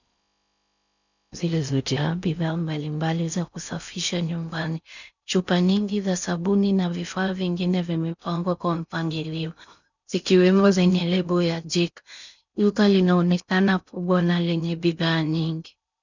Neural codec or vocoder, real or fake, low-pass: codec, 16 kHz in and 24 kHz out, 0.6 kbps, FocalCodec, streaming, 4096 codes; fake; 7.2 kHz